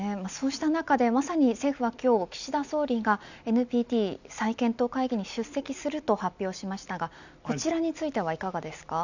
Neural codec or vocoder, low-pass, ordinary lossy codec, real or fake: none; 7.2 kHz; none; real